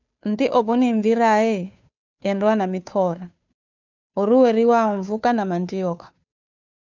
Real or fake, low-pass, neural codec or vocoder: fake; 7.2 kHz; codec, 16 kHz, 2 kbps, FunCodec, trained on Chinese and English, 25 frames a second